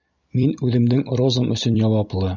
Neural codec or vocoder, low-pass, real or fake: vocoder, 44.1 kHz, 128 mel bands every 256 samples, BigVGAN v2; 7.2 kHz; fake